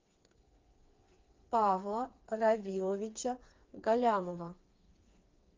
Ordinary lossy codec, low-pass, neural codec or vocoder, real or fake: Opus, 24 kbps; 7.2 kHz; codec, 16 kHz, 4 kbps, FreqCodec, smaller model; fake